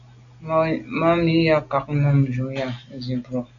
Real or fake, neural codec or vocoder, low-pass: real; none; 7.2 kHz